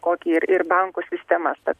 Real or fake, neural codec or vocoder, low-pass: real; none; 14.4 kHz